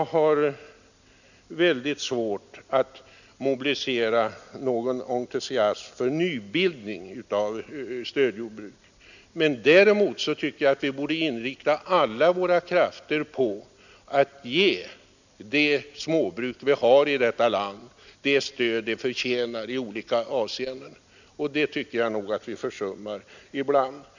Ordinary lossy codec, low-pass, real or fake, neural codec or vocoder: none; 7.2 kHz; real; none